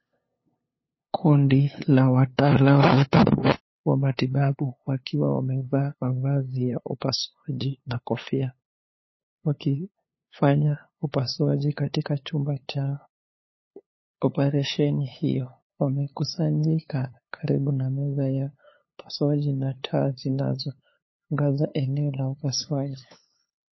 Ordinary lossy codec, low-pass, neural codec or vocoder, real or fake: MP3, 24 kbps; 7.2 kHz; codec, 16 kHz, 2 kbps, FunCodec, trained on LibriTTS, 25 frames a second; fake